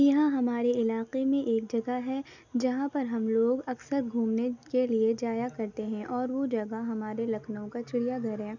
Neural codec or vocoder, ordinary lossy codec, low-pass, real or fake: none; none; 7.2 kHz; real